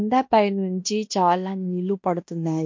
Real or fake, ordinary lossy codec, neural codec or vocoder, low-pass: fake; MP3, 48 kbps; codec, 16 kHz in and 24 kHz out, 0.9 kbps, LongCat-Audio-Codec, fine tuned four codebook decoder; 7.2 kHz